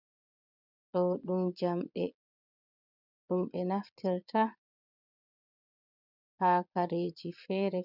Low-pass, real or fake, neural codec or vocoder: 5.4 kHz; fake; vocoder, 22.05 kHz, 80 mel bands, Vocos